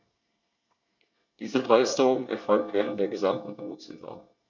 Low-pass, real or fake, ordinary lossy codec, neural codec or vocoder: 7.2 kHz; fake; none; codec, 24 kHz, 1 kbps, SNAC